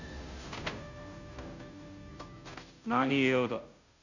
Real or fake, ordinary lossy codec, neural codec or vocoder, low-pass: fake; none; codec, 16 kHz, 0.5 kbps, FunCodec, trained on Chinese and English, 25 frames a second; 7.2 kHz